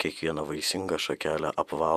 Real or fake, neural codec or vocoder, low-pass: fake; vocoder, 48 kHz, 128 mel bands, Vocos; 14.4 kHz